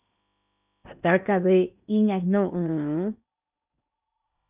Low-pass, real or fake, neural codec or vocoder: 3.6 kHz; fake; codec, 16 kHz in and 24 kHz out, 0.8 kbps, FocalCodec, streaming, 65536 codes